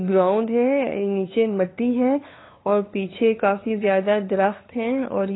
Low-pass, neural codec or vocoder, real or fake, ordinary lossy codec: 7.2 kHz; codec, 16 kHz, 2 kbps, FunCodec, trained on LibriTTS, 25 frames a second; fake; AAC, 16 kbps